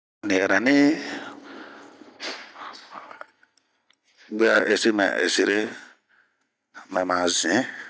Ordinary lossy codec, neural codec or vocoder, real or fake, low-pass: none; none; real; none